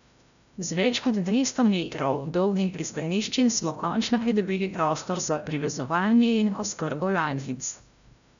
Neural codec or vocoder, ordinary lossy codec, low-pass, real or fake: codec, 16 kHz, 0.5 kbps, FreqCodec, larger model; none; 7.2 kHz; fake